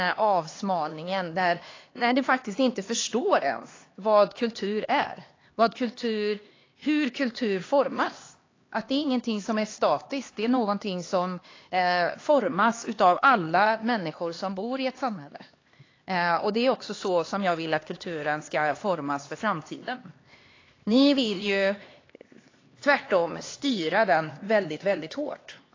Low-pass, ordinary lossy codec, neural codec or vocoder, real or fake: 7.2 kHz; AAC, 32 kbps; codec, 16 kHz, 2 kbps, X-Codec, HuBERT features, trained on LibriSpeech; fake